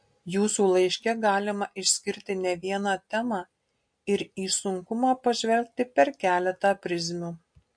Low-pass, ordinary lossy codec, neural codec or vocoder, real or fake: 9.9 kHz; MP3, 48 kbps; none; real